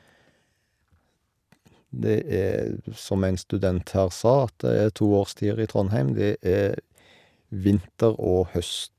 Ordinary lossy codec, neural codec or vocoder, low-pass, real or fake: none; none; 14.4 kHz; real